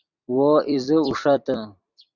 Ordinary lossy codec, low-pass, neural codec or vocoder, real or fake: Opus, 64 kbps; 7.2 kHz; vocoder, 44.1 kHz, 128 mel bands every 256 samples, BigVGAN v2; fake